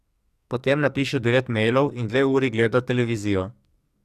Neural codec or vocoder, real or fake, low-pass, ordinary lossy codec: codec, 44.1 kHz, 2.6 kbps, SNAC; fake; 14.4 kHz; Opus, 64 kbps